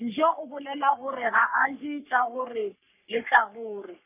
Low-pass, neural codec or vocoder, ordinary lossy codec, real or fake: 3.6 kHz; codec, 44.1 kHz, 3.4 kbps, Pupu-Codec; none; fake